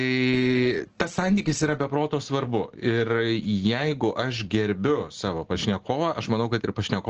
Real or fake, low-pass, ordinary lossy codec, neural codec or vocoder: real; 7.2 kHz; Opus, 16 kbps; none